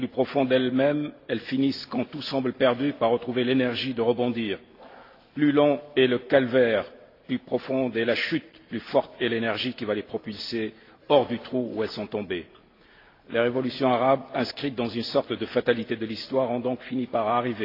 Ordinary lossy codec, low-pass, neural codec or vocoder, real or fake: AAC, 32 kbps; 5.4 kHz; none; real